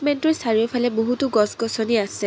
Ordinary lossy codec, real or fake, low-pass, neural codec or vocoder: none; real; none; none